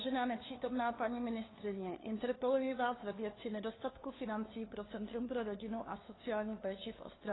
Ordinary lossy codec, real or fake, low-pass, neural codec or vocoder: AAC, 16 kbps; fake; 7.2 kHz; codec, 16 kHz, 2 kbps, FunCodec, trained on LibriTTS, 25 frames a second